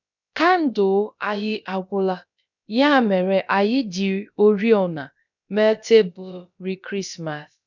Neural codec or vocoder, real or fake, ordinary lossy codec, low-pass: codec, 16 kHz, about 1 kbps, DyCAST, with the encoder's durations; fake; none; 7.2 kHz